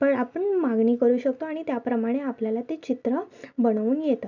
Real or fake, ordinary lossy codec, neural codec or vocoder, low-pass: real; MP3, 64 kbps; none; 7.2 kHz